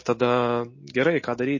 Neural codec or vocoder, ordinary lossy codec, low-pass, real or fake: none; MP3, 32 kbps; 7.2 kHz; real